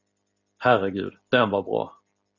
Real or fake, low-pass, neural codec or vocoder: real; 7.2 kHz; none